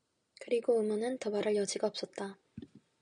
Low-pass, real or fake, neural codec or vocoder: 9.9 kHz; real; none